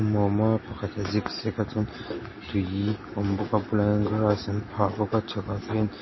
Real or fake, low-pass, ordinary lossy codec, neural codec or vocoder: real; 7.2 kHz; MP3, 24 kbps; none